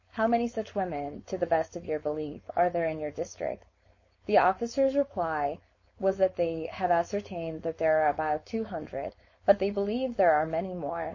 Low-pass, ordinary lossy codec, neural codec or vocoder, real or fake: 7.2 kHz; MP3, 32 kbps; codec, 16 kHz, 4.8 kbps, FACodec; fake